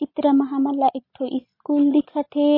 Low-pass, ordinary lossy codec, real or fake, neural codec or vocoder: 5.4 kHz; MP3, 24 kbps; real; none